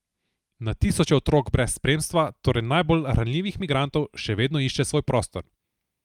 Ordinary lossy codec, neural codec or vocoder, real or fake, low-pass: Opus, 32 kbps; none; real; 19.8 kHz